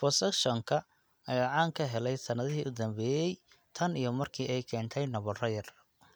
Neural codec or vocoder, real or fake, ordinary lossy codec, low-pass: none; real; none; none